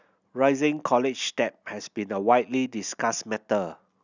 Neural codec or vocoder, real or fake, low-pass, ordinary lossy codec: none; real; 7.2 kHz; none